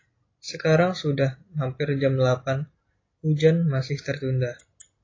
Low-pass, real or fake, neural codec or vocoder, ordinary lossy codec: 7.2 kHz; real; none; AAC, 32 kbps